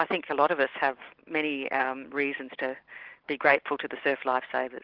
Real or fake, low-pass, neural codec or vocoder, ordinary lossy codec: real; 5.4 kHz; none; Opus, 16 kbps